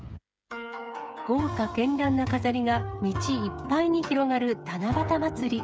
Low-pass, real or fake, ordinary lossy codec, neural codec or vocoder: none; fake; none; codec, 16 kHz, 8 kbps, FreqCodec, smaller model